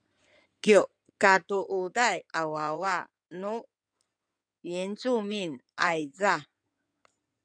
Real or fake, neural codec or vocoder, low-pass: fake; codec, 16 kHz in and 24 kHz out, 2.2 kbps, FireRedTTS-2 codec; 9.9 kHz